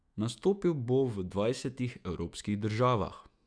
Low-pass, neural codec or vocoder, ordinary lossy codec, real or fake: 9.9 kHz; autoencoder, 48 kHz, 128 numbers a frame, DAC-VAE, trained on Japanese speech; Opus, 64 kbps; fake